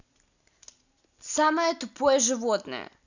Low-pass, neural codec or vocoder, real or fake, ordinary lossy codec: 7.2 kHz; none; real; none